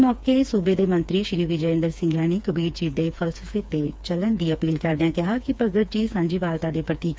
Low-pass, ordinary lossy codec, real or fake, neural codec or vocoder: none; none; fake; codec, 16 kHz, 4 kbps, FreqCodec, smaller model